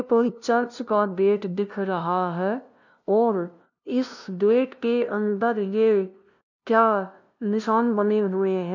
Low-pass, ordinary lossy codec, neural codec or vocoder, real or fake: 7.2 kHz; none; codec, 16 kHz, 0.5 kbps, FunCodec, trained on LibriTTS, 25 frames a second; fake